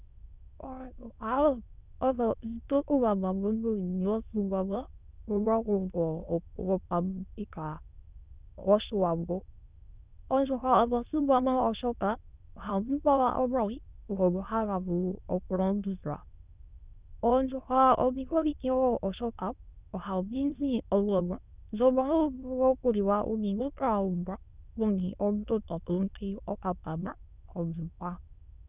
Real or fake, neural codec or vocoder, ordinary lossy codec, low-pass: fake; autoencoder, 22.05 kHz, a latent of 192 numbers a frame, VITS, trained on many speakers; Opus, 64 kbps; 3.6 kHz